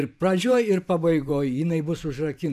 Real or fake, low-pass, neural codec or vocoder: fake; 14.4 kHz; vocoder, 44.1 kHz, 128 mel bands every 512 samples, BigVGAN v2